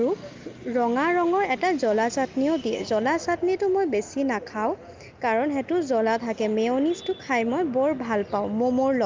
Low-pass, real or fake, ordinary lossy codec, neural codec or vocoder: 7.2 kHz; real; Opus, 32 kbps; none